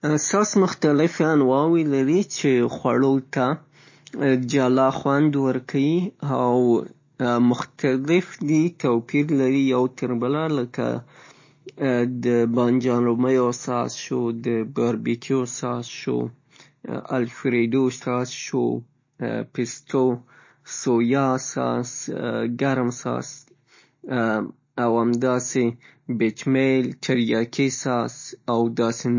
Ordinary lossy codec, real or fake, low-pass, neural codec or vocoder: MP3, 32 kbps; real; 7.2 kHz; none